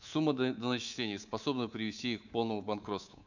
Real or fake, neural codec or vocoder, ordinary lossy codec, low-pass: fake; codec, 24 kHz, 3.1 kbps, DualCodec; none; 7.2 kHz